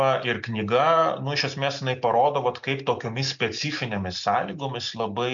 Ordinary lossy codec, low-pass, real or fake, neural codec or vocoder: MP3, 64 kbps; 7.2 kHz; real; none